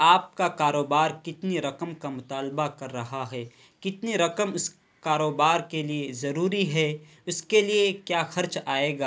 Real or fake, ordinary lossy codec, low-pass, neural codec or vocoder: real; none; none; none